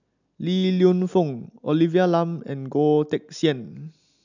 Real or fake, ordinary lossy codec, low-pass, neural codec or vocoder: real; none; 7.2 kHz; none